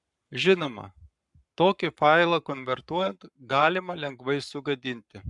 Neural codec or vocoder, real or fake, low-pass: codec, 44.1 kHz, 7.8 kbps, Pupu-Codec; fake; 10.8 kHz